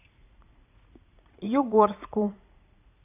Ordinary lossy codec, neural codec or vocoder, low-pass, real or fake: AAC, 32 kbps; vocoder, 44.1 kHz, 80 mel bands, Vocos; 3.6 kHz; fake